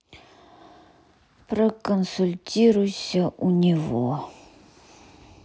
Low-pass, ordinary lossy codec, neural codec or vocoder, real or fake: none; none; none; real